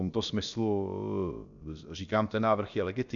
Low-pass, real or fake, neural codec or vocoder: 7.2 kHz; fake; codec, 16 kHz, about 1 kbps, DyCAST, with the encoder's durations